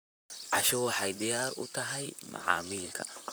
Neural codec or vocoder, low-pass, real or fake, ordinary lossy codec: vocoder, 44.1 kHz, 128 mel bands, Pupu-Vocoder; none; fake; none